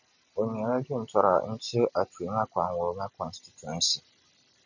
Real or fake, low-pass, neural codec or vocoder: real; 7.2 kHz; none